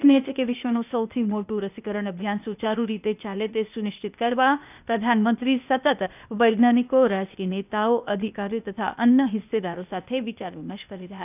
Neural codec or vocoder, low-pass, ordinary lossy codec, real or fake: codec, 16 kHz, 0.8 kbps, ZipCodec; 3.6 kHz; none; fake